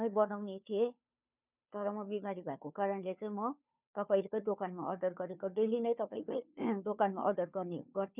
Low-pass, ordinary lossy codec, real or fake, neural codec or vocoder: 3.6 kHz; none; fake; codec, 16 kHz, 2 kbps, FunCodec, trained on Chinese and English, 25 frames a second